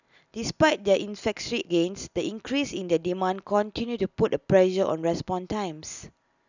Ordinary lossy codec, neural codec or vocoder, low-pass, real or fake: none; none; 7.2 kHz; real